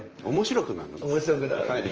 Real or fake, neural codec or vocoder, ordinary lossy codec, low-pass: fake; vocoder, 44.1 kHz, 128 mel bands, Pupu-Vocoder; Opus, 24 kbps; 7.2 kHz